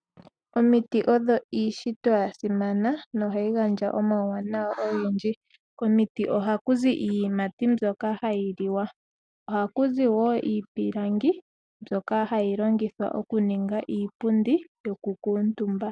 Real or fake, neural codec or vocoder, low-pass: real; none; 9.9 kHz